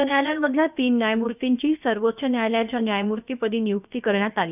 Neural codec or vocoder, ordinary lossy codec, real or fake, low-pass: codec, 16 kHz, about 1 kbps, DyCAST, with the encoder's durations; none; fake; 3.6 kHz